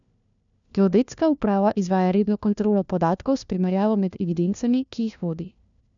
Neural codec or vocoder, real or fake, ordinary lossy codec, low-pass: codec, 16 kHz, 1 kbps, FunCodec, trained on LibriTTS, 50 frames a second; fake; none; 7.2 kHz